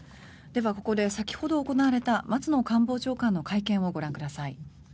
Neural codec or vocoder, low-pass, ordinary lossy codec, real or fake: none; none; none; real